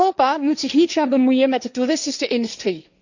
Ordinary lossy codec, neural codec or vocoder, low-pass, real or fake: none; codec, 16 kHz, 1.1 kbps, Voila-Tokenizer; 7.2 kHz; fake